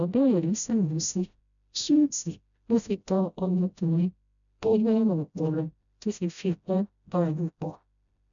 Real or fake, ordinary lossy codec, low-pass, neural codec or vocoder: fake; none; 7.2 kHz; codec, 16 kHz, 0.5 kbps, FreqCodec, smaller model